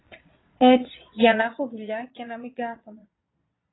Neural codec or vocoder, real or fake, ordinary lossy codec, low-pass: vocoder, 22.05 kHz, 80 mel bands, Vocos; fake; AAC, 16 kbps; 7.2 kHz